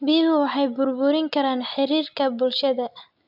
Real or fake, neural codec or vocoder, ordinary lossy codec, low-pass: real; none; AAC, 48 kbps; 5.4 kHz